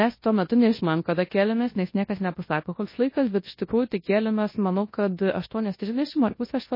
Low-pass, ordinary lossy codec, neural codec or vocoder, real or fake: 5.4 kHz; MP3, 24 kbps; codec, 24 kHz, 0.9 kbps, WavTokenizer, large speech release; fake